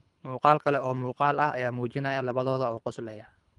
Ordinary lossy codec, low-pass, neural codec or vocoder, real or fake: Opus, 32 kbps; 10.8 kHz; codec, 24 kHz, 3 kbps, HILCodec; fake